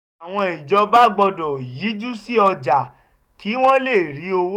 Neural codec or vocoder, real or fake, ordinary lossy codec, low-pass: codec, 44.1 kHz, 7.8 kbps, DAC; fake; none; 19.8 kHz